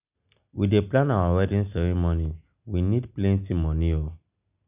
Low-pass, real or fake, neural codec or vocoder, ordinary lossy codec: 3.6 kHz; real; none; none